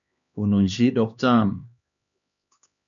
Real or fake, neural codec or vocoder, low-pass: fake; codec, 16 kHz, 2 kbps, X-Codec, HuBERT features, trained on LibriSpeech; 7.2 kHz